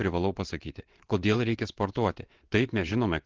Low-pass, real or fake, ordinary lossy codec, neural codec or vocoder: 7.2 kHz; fake; Opus, 16 kbps; vocoder, 22.05 kHz, 80 mel bands, WaveNeXt